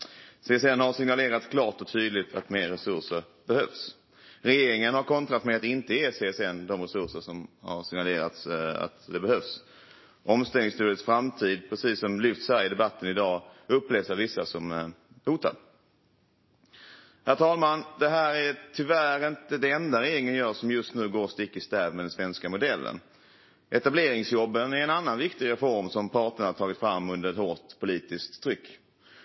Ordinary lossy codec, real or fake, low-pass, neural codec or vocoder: MP3, 24 kbps; real; 7.2 kHz; none